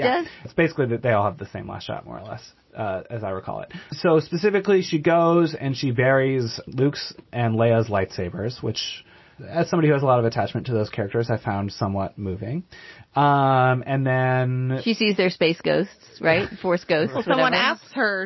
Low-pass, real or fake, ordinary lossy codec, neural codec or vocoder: 7.2 kHz; real; MP3, 24 kbps; none